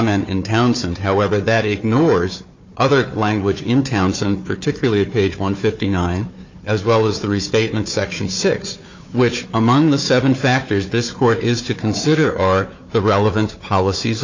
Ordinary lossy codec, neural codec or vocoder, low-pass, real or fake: MP3, 64 kbps; codec, 16 kHz, 4 kbps, FunCodec, trained on Chinese and English, 50 frames a second; 7.2 kHz; fake